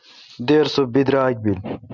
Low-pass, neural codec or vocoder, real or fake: 7.2 kHz; none; real